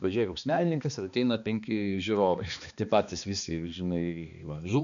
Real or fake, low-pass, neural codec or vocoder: fake; 7.2 kHz; codec, 16 kHz, 2 kbps, X-Codec, HuBERT features, trained on balanced general audio